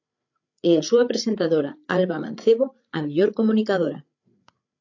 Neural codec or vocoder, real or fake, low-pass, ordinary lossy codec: codec, 16 kHz, 8 kbps, FreqCodec, larger model; fake; 7.2 kHz; AAC, 48 kbps